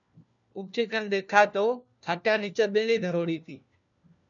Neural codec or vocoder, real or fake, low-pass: codec, 16 kHz, 1 kbps, FunCodec, trained on LibriTTS, 50 frames a second; fake; 7.2 kHz